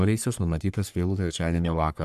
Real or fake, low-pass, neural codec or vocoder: fake; 14.4 kHz; codec, 44.1 kHz, 3.4 kbps, Pupu-Codec